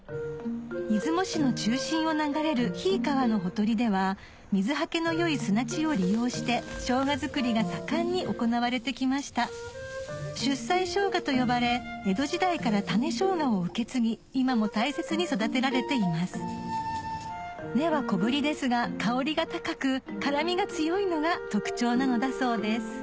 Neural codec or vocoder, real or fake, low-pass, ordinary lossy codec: none; real; none; none